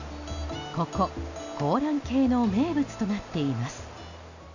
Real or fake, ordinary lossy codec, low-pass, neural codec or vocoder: real; none; 7.2 kHz; none